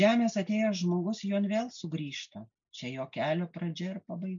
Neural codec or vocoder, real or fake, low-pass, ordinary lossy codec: none; real; 7.2 kHz; MP3, 64 kbps